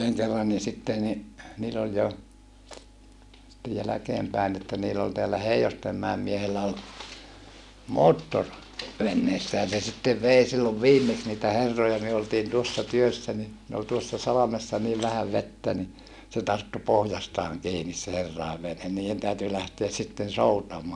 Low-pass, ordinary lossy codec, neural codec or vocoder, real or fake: none; none; none; real